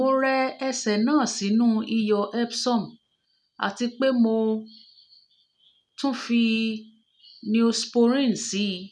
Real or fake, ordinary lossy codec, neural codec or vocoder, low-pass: real; none; none; none